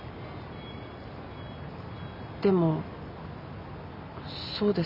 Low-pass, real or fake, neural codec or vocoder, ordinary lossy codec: 5.4 kHz; real; none; none